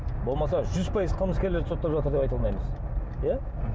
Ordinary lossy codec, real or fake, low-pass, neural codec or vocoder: none; real; none; none